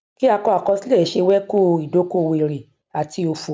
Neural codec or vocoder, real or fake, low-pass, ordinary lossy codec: none; real; none; none